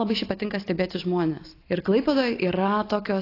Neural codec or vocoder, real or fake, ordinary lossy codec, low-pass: none; real; AAC, 32 kbps; 5.4 kHz